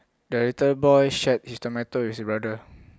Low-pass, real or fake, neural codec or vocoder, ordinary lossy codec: none; real; none; none